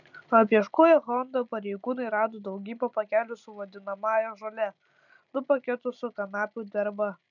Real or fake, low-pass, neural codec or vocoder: real; 7.2 kHz; none